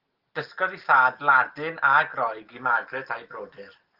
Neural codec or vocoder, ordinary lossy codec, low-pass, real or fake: none; Opus, 16 kbps; 5.4 kHz; real